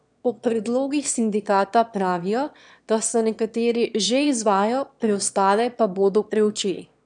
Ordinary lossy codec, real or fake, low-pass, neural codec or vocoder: none; fake; 9.9 kHz; autoencoder, 22.05 kHz, a latent of 192 numbers a frame, VITS, trained on one speaker